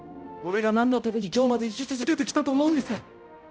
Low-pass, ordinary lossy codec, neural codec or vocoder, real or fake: none; none; codec, 16 kHz, 0.5 kbps, X-Codec, HuBERT features, trained on balanced general audio; fake